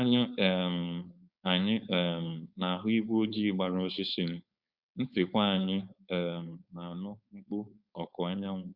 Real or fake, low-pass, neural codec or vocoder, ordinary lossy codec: fake; 5.4 kHz; codec, 16 kHz, 4.8 kbps, FACodec; Opus, 64 kbps